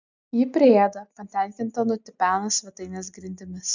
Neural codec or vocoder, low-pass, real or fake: none; 7.2 kHz; real